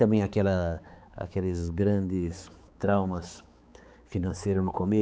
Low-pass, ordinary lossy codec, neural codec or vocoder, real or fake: none; none; codec, 16 kHz, 4 kbps, X-Codec, HuBERT features, trained on balanced general audio; fake